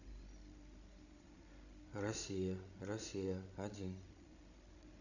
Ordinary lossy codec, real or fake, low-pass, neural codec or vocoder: none; fake; 7.2 kHz; codec, 16 kHz, 16 kbps, FreqCodec, larger model